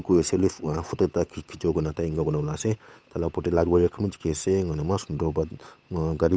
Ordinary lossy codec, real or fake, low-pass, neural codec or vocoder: none; fake; none; codec, 16 kHz, 8 kbps, FunCodec, trained on Chinese and English, 25 frames a second